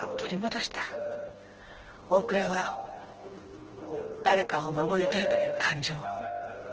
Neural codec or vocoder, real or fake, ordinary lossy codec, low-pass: codec, 16 kHz, 1 kbps, FreqCodec, smaller model; fake; Opus, 16 kbps; 7.2 kHz